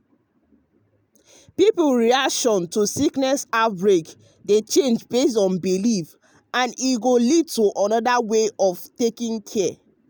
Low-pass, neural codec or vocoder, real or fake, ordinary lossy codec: none; none; real; none